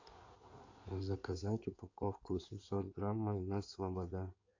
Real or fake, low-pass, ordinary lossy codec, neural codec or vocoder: fake; 7.2 kHz; AAC, 48 kbps; codec, 16 kHz in and 24 kHz out, 2.2 kbps, FireRedTTS-2 codec